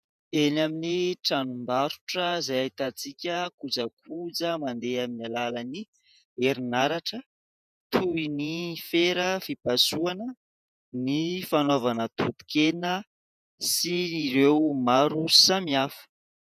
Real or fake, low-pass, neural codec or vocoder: fake; 14.4 kHz; vocoder, 48 kHz, 128 mel bands, Vocos